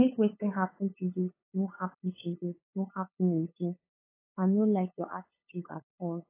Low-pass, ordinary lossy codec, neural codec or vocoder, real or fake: 3.6 kHz; AAC, 24 kbps; codec, 16 kHz, 8 kbps, FunCodec, trained on LibriTTS, 25 frames a second; fake